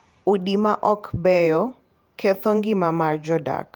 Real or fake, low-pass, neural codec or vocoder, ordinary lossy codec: fake; 19.8 kHz; vocoder, 48 kHz, 128 mel bands, Vocos; Opus, 32 kbps